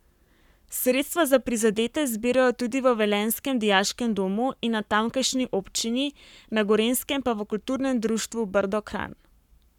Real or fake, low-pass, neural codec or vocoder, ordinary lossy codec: fake; 19.8 kHz; codec, 44.1 kHz, 7.8 kbps, Pupu-Codec; none